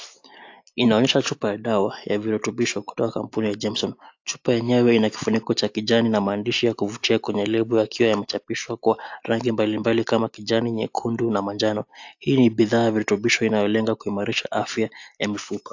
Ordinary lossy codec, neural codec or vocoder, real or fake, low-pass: AAC, 48 kbps; none; real; 7.2 kHz